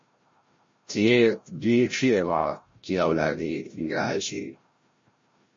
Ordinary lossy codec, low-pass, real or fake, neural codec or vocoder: MP3, 32 kbps; 7.2 kHz; fake; codec, 16 kHz, 0.5 kbps, FreqCodec, larger model